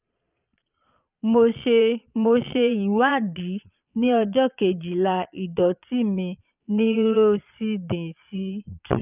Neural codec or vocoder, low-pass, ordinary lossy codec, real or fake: vocoder, 22.05 kHz, 80 mel bands, Vocos; 3.6 kHz; none; fake